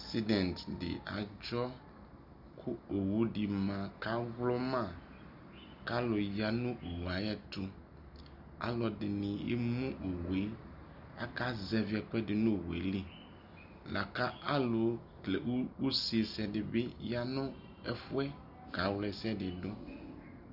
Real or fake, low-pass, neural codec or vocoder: real; 5.4 kHz; none